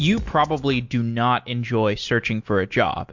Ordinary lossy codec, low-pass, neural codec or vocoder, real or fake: MP3, 48 kbps; 7.2 kHz; none; real